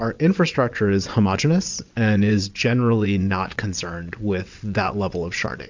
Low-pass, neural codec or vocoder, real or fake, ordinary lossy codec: 7.2 kHz; vocoder, 22.05 kHz, 80 mel bands, WaveNeXt; fake; MP3, 64 kbps